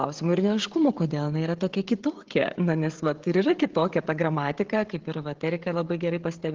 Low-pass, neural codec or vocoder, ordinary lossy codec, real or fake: 7.2 kHz; codec, 16 kHz, 16 kbps, FreqCodec, smaller model; Opus, 16 kbps; fake